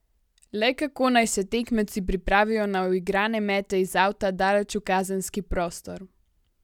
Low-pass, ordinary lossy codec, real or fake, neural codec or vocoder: 19.8 kHz; none; real; none